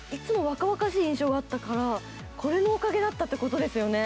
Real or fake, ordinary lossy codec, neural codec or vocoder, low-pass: real; none; none; none